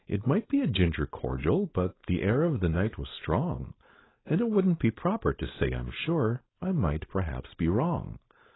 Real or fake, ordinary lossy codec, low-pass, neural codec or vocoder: fake; AAC, 16 kbps; 7.2 kHz; codec, 16 kHz, 4.8 kbps, FACodec